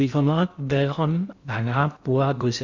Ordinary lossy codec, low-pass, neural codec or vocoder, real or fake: Opus, 64 kbps; 7.2 kHz; codec, 16 kHz in and 24 kHz out, 0.6 kbps, FocalCodec, streaming, 2048 codes; fake